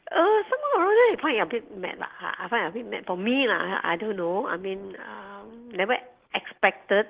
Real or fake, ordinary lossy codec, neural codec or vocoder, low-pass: real; Opus, 16 kbps; none; 3.6 kHz